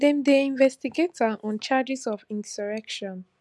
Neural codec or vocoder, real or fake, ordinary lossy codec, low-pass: none; real; none; none